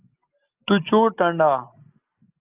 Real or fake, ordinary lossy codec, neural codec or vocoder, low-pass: real; Opus, 24 kbps; none; 3.6 kHz